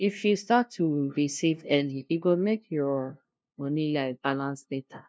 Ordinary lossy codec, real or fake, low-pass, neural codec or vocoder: none; fake; none; codec, 16 kHz, 0.5 kbps, FunCodec, trained on LibriTTS, 25 frames a second